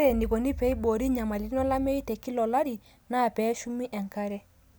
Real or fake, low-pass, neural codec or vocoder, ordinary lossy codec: real; none; none; none